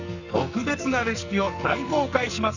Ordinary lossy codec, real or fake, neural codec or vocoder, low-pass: none; fake; codec, 44.1 kHz, 2.6 kbps, SNAC; 7.2 kHz